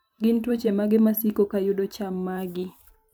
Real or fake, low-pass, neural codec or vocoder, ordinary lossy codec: fake; none; vocoder, 44.1 kHz, 128 mel bands every 512 samples, BigVGAN v2; none